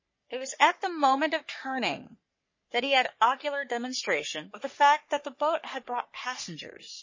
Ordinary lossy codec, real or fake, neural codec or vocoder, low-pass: MP3, 32 kbps; fake; codec, 44.1 kHz, 3.4 kbps, Pupu-Codec; 7.2 kHz